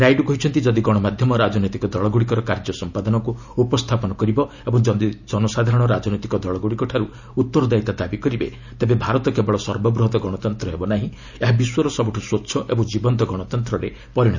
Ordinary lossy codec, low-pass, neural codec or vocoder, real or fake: none; 7.2 kHz; none; real